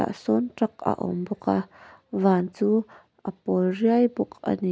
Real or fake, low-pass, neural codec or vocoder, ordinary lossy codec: real; none; none; none